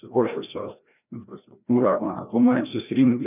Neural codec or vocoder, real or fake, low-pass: codec, 16 kHz, 1 kbps, FreqCodec, larger model; fake; 3.6 kHz